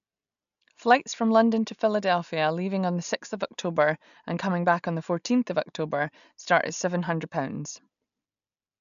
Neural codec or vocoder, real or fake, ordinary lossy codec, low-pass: none; real; none; 7.2 kHz